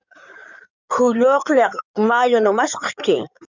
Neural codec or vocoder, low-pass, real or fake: codec, 16 kHz in and 24 kHz out, 2.2 kbps, FireRedTTS-2 codec; 7.2 kHz; fake